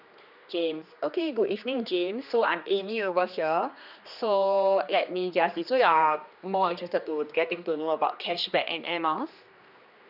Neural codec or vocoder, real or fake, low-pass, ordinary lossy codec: codec, 16 kHz, 2 kbps, X-Codec, HuBERT features, trained on general audio; fake; 5.4 kHz; none